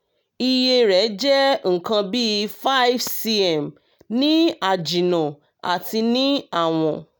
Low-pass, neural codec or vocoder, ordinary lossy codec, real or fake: none; none; none; real